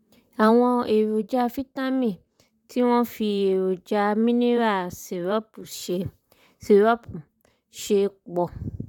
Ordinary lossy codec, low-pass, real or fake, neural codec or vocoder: none; 19.8 kHz; fake; vocoder, 44.1 kHz, 128 mel bands, Pupu-Vocoder